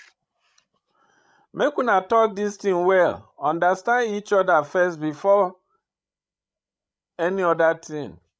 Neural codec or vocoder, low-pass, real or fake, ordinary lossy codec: codec, 16 kHz, 16 kbps, FreqCodec, larger model; none; fake; none